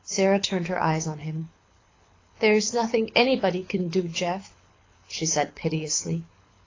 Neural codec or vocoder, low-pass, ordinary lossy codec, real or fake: codec, 24 kHz, 6 kbps, HILCodec; 7.2 kHz; AAC, 32 kbps; fake